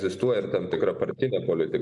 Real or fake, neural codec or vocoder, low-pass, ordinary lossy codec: real; none; 10.8 kHz; AAC, 64 kbps